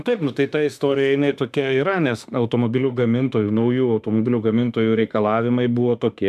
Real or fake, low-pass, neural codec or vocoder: fake; 14.4 kHz; autoencoder, 48 kHz, 32 numbers a frame, DAC-VAE, trained on Japanese speech